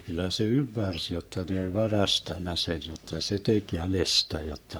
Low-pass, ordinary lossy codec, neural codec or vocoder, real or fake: none; none; codec, 44.1 kHz, 3.4 kbps, Pupu-Codec; fake